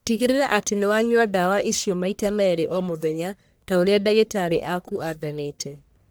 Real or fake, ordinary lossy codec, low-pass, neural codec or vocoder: fake; none; none; codec, 44.1 kHz, 1.7 kbps, Pupu-Codec